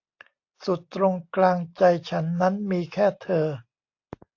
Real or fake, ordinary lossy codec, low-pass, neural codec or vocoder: real; AAC, 48 kbps; 7.2 kHz; none